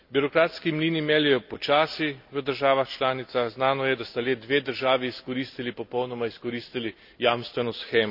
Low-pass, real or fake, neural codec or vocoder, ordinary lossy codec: 5.4 kHz; real; none; none